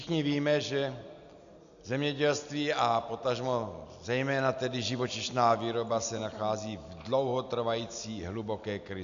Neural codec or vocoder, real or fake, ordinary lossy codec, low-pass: none; real; AAC, 96 kbps; 7.2 kHz